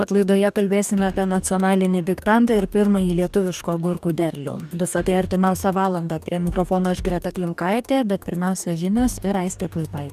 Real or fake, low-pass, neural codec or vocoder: fake; 14.4 kHz; codec, 44.1 kHz, 2.6 kbps, DAC